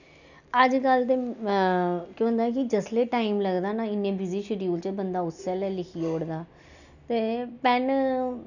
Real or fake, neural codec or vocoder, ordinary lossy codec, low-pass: real; none; none; 7.2 kHz